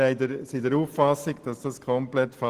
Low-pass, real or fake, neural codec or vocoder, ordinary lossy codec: 14.4 kHz; real; none; Opus, 16 kbps